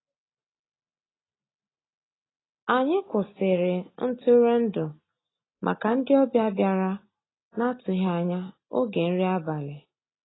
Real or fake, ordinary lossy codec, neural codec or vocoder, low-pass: real; AAC, 16 kbps; none; 7.2 kHz